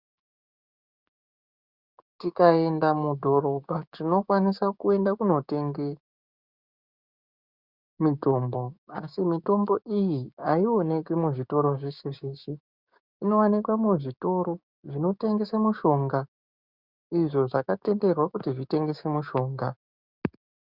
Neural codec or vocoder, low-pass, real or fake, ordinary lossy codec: codec, 16 kHz, 6 kbps, DAC; 5.4 kHz; fake; Opus, 64 kbps